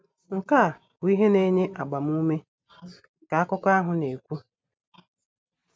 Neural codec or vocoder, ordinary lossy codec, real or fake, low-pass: none; none; real; none